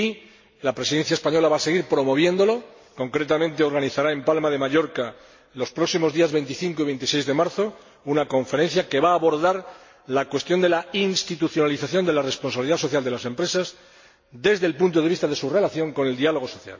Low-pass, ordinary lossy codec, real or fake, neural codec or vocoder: 7.2 kHz; MP3, 32 kbps; real; none